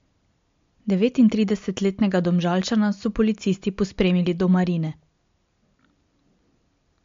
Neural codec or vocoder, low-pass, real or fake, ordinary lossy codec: none; 7.2 kHz; real; MP3, 48 kbps